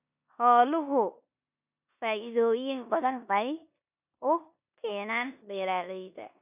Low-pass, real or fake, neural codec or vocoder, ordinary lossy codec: 3.6 kHz; fake; codec, 16 kHz in and 24 kHz out, 0.9 kbps, LongCat-Audio-Codec, four codebook decoder; none